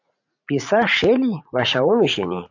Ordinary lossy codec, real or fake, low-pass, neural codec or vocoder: AAC, 48 kbps; fake; 7.2 kHz; vocoder, 44.1 kHz, 80 mel bands, Vocos